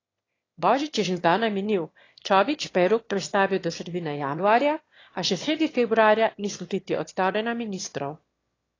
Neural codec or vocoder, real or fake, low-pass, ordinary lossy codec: autoencoder, 22.05 kHz, a latent of 192 numbers a frame, VITS, trained on one speaker; fake; 7.2 kHz; AAC, 32 kbps